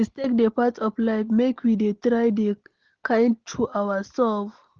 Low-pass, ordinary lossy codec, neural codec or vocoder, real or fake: 7.2 kHz; Opus, 16 kbps; none; real